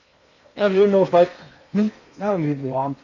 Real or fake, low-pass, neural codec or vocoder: fake; 7.2 kHz; codec, 16 kHz in and 24 kHz out, 0.8 kbps, FocalCodec, streaming, 65536 codes